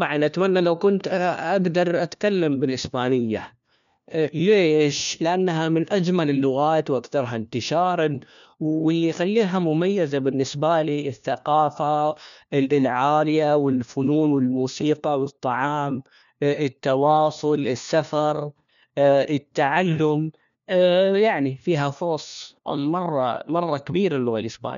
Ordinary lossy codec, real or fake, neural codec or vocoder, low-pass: MP3, 96 kbps; fake; codec, 16 kHz, 1 kbps, FunCodec, trained on LibriTTS, 50 frames a second; 7.2 kHz